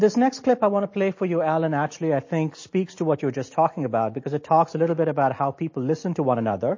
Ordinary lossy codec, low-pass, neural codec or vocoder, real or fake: MP3, 32 kbps; 7.2 kHz; none; real